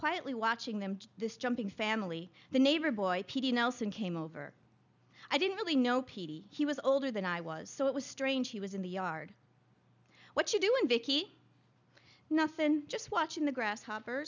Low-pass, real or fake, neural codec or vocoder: 7.2 kHz; real; none